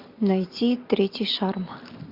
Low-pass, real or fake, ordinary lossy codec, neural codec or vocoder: 5.4 kHz; real; MP3, 48 kbps; none